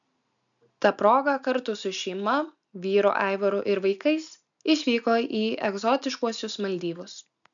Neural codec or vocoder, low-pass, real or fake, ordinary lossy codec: none; 7.2 kHz; real; MP3, 96 kbps